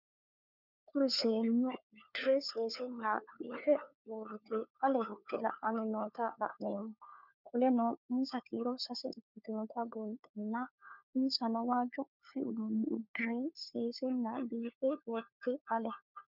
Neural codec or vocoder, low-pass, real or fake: codec, 16 kHz, 4 kbps, FunCodec, trained on LibriTTS, 50 frames a second; 5.4 kHz; fake